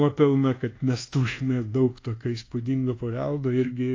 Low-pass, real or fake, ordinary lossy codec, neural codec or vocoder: 7.2 kHz; fake; AAC, 32 kbps; codec, 24 kHz, 1.2 kbps, DualCodec